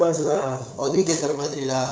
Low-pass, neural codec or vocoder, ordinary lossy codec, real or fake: none; codec, 16 kHz, 8 kbps, FunCodec, trained on LibriTTS, 25 frames a second; none; fake